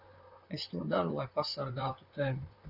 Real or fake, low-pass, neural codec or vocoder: fake; 5.4 kHz; vocoder, 44.1 kHz, 128 mel bands, Pupu-Vocoder